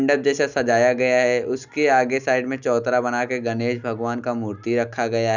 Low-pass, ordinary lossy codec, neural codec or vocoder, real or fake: 7.2 kHz; none; none; real